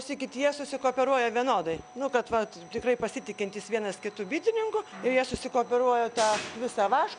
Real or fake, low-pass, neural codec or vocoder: real; 9.9 kHz; none